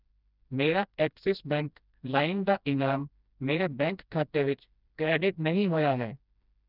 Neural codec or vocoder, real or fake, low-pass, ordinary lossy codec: codec, 16 kHz, 1 kbps, FreqCodec, smaller model; fake; 5.4 kHz; none